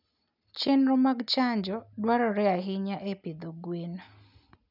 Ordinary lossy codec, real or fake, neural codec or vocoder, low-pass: none; real; none; 5.4 kHz